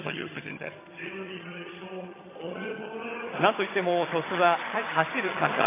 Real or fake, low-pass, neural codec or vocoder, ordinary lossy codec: fake; 3.6 kHz; vocoder, 22.05 kHz, 80 mel bands, HiFi-GAN; AAC, 16 kbps